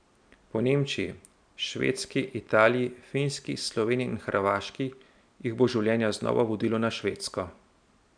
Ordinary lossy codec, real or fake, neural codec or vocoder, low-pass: MP3, 96 kbps; real; none; 9.9 kHz